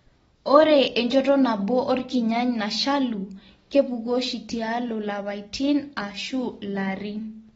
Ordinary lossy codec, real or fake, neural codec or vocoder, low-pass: AAC, 24 kbps; real; none; 19.8 kHz